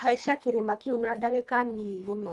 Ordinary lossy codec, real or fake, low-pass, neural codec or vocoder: none; fake; none; codec, 24 kHz, 1.5 kbps, HILCodec